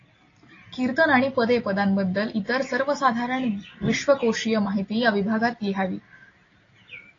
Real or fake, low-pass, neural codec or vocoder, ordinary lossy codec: real; 7.2 kHz; none; AAC, 32 kbps